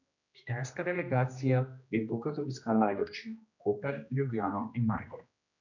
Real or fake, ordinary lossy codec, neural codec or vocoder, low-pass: fake; none; codec, 16 kHz, 1 kbps, X-Codec, HuBERT features, trained on general audio; 7.2 kHz